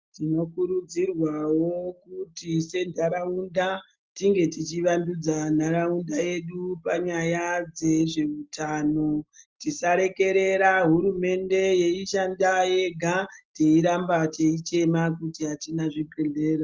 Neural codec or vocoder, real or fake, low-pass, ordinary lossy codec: none; real; 7.2 kHz; Opus, 32 kbps